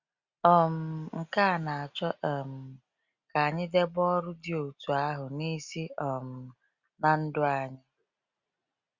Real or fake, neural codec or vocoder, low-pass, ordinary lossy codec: real; none; 7.2 kHz; Opus, 64 kbps